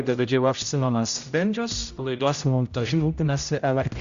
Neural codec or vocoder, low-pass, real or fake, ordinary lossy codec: codec, 16 kHz, 0.5 kbps, X-Codec, HuBERT features, trained on general audio; 7.2 kHz; fake; Opus, 64 kbps